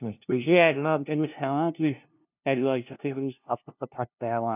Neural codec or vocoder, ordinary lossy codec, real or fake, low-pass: codec, 16 kHz, 0.5 kbps, FunCodec, trained on LibriTTS, 25 frames a second; none; fake; 3.6 kHz